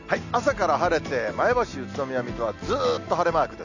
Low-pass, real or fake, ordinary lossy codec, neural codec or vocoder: 7.2 kHz; real; none; none